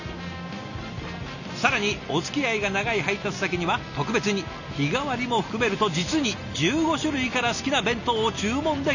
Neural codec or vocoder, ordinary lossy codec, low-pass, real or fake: none; none; 7.2 kHz; real